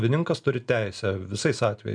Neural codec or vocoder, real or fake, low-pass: none; real; 9.9 kHz